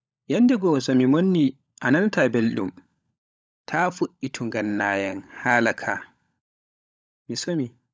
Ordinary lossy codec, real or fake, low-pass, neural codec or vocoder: none; fake; none; codec, 16 kHz, 16 kbps, FunCodec, trained on LibriTTS, 50 frames a second